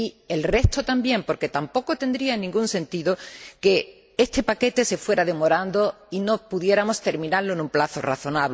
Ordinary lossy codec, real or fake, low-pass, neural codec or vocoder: none; real; none; none